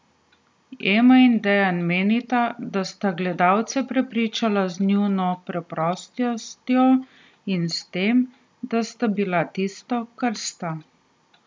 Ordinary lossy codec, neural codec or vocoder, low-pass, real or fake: none; none; none; real